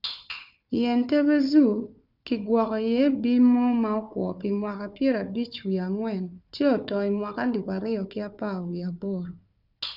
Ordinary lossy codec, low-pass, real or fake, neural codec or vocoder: none; 5.4 kHz; fake; codec, 16 kHz, 4 kbps, FunCodec, trained on Chinese and English, 50 frames a second